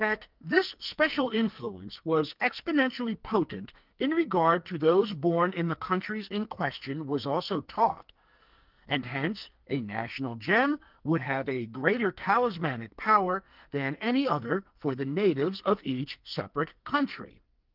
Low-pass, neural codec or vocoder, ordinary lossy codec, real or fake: 5.4 kHz; codec, 44.1 kHz, 2.6 kbps, SNAC; Opus, 24 kbps; fake